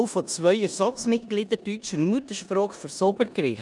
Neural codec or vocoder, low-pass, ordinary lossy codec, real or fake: codec, 16 kHz in and 24 kHz out, 0.9 kbps, LongCat-Audio-Codec, four codebook decoder; 10.8 kHz; none; fake